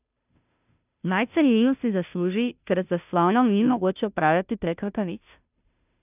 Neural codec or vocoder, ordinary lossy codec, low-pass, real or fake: codec, 16 kHz, 0.5 kbps, FunCodec, trained on Chinese and English, 25 frames a second; none; 3.6 kHz; fake